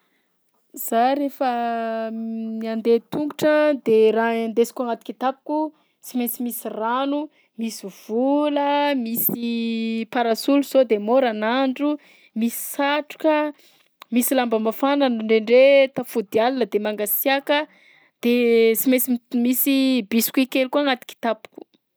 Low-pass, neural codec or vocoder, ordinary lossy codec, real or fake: none; none; none; real